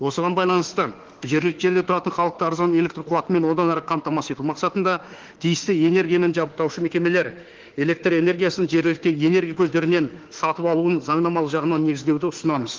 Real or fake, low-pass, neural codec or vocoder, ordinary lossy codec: fake; 7.2 kHz; autoencoder, 48 kHz, 32 numbers a frame, DAC-VAE, trained on Japanese speech; Opus, 16 kbps